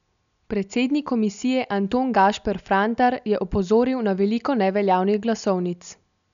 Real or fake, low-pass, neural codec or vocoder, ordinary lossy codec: real; 7.2 kHz; none; none